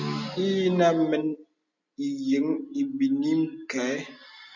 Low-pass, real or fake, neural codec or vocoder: 7.2 kHz; real; none